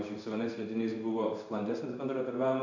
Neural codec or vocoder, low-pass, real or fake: codec, 16 kHz in and 24 kHz out, 1 kbps, XY-Tokenizer; 7.2 kHz; fake